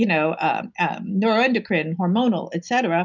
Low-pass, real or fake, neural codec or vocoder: 7.2 kHz; real; none